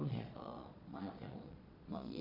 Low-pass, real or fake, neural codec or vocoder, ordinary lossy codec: 5.4 kHz; fake; vocoder, 22.05 kHz, 80 mel bands, Vocos; none